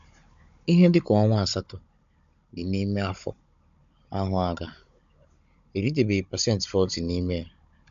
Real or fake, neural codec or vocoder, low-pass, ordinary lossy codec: fake; codec, 16 kHz, 16 kbps, FunCodec, trained on Chinese and English, 50 frames a second; 7.2 kHz; MP3, 64 kbps